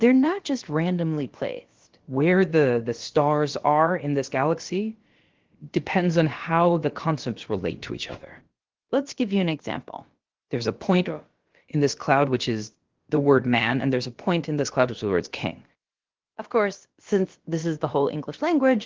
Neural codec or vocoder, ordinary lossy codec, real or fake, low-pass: codec, 16 kHz, about 1 kbps, DyCAST, with the encoder's durations; Opus, 16 kbps; fake; 7.2 kHz